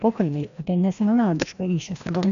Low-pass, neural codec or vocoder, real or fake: 7.2 kHz; codec, 16 kHz, 1 kbps, FreqCodec, larger model; fake